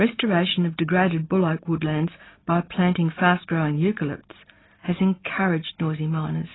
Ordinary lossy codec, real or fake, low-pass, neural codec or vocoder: AAC, 16 kbps; real; 7.2 kHz; none